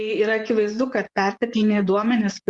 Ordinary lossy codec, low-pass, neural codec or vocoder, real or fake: Opus, 64 kbps; 10.8 kHz; vocoder, 24 kHz, 100 mel bands, Vocos; fake